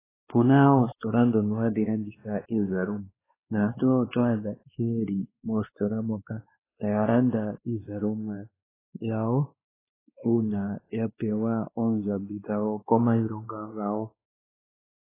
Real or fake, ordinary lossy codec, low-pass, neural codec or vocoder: fake; AAC, 16 kbps; 3.6 kHz; codec, 16 kHz, 2 kbps, X-Codec, WavLM features, trained on Multilingual LibriSpeech